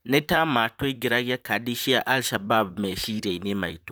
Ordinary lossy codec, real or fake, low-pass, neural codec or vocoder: none; fake; none; vocoder, 44.1 kHz, 128 mel bands, Pupu-Vocoder